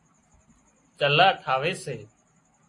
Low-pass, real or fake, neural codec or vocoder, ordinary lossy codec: 10.8 kHz; real; none; AAC, 48 kbps